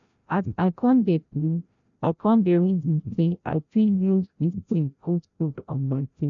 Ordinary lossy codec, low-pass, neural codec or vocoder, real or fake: none; 7.2 kHz; codec, 16 kHz, 0.5 kbps, FreqCodec, larger model; fake